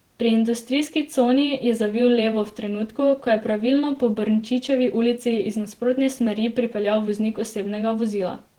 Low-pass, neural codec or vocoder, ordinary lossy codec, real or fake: 19.8 kHz; vocoder, 48 kHz, 128 mel bands, Vocos; Opus, 16 kbps; fake